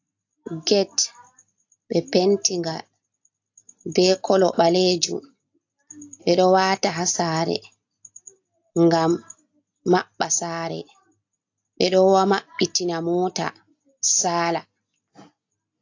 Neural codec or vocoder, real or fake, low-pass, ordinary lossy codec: none; real; 7.2 kHz; AAC, 48 kbps